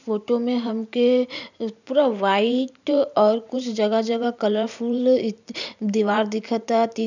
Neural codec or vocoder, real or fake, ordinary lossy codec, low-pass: vocoder, 44.1 kHz, 128 mel bands every 512 samples, BigVGAN v2; fake; none; 7.2 kHz